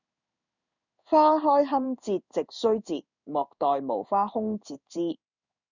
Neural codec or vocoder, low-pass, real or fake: codec, 16 kHz in and 24 kHz out, 1 kbps, XY-Tokenizer; 7.2 kHz; fake